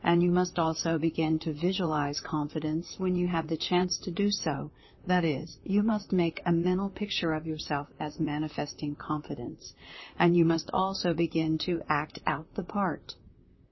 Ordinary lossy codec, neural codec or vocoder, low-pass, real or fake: MP3, 24 kbps; vocoder, 44.1 kHz, 128 mel bands, Pupu-Vocoder; 7.2 kHz; fake